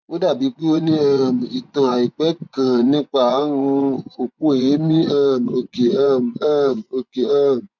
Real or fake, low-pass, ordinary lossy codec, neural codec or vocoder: fake; 7.2 kHz; none; vocoder, 24 kHz, 100 mel bands, Vocos